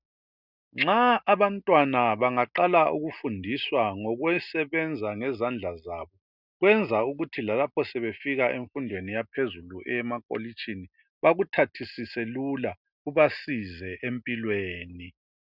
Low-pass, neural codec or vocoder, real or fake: 5.4 kHz; none; real